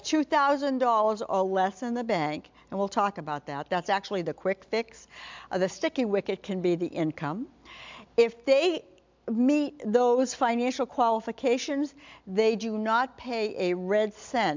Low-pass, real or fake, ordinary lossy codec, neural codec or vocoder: 7.2 kHz; real; MP3, 64 kbps; none